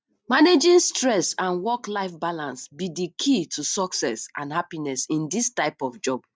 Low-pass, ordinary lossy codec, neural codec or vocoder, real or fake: none; none; none; real